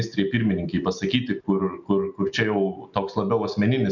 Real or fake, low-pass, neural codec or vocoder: real; 7.2 kHz; none